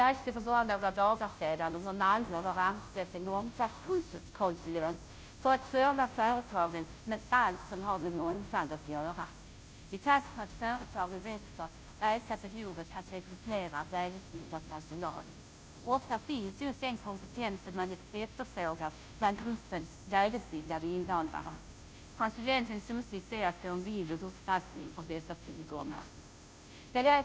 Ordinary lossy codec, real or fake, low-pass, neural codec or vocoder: none; fake; none; codec, 16 kHz, 0.5 kbps, FunCodec, trained on Chinese and English, 25 frames a second